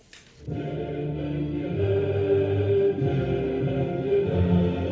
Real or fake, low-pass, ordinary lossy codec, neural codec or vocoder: real; none; none; none